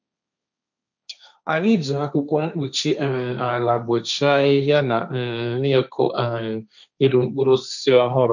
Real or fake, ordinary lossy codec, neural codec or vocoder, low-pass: fake; none; codec, 16 kHz, 1.1 kbps, Voila-Tokenizer; 7.2 kHz